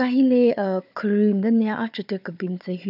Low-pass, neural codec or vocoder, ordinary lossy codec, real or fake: 5.4 kHz; codec, 16 kHz, 4 kbps, FunCodec, trained on Chinese and English, 50 frames a second; none; fake